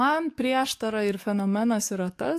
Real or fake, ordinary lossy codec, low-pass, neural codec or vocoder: fake; AAC, 64 kbps; 14.4 kHz; codec, 44.1 kHz, 7.8 kbps, DAC